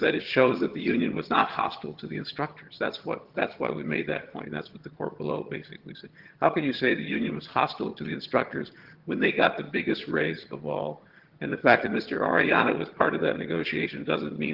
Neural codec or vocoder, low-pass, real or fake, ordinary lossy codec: vocoder, 22.05 kHz, 80 mel bands, HiFi-GAN; 5.4 kHz; fake; Opus, 16 kbps